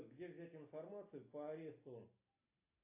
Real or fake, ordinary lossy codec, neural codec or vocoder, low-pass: real; AAC, 32 kbps; none; 3.6 kHz